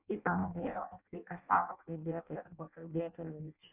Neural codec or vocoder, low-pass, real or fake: codec, 16 kHz in and 24 kHz out, 0.6 kbps, FireRedTTS-2 codec; 3.6 kHz; fake